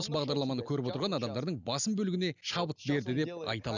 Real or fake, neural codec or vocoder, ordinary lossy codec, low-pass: real; none; none; 7.2 kHz